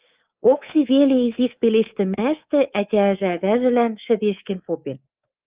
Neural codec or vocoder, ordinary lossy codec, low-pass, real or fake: codec, 24 kHz, 3.1 kbps, DualCodec; Opus, 32 kbps; 3.6 kHz; fake